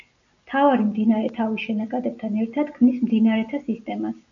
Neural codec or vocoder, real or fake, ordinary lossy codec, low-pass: none; real; MP3, 96 kbps; 7.2 kHz